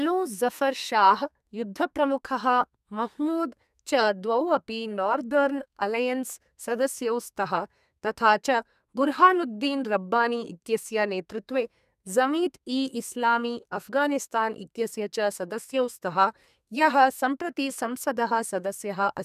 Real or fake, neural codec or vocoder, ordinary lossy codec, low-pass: fake; codec, 32 kHz, 1.9 kbps, SNAC; none; 14.4 kHz